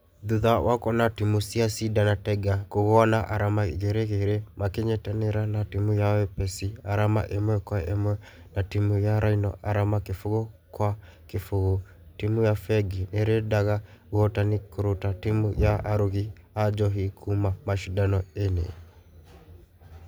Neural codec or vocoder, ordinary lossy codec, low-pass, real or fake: none; none; none; real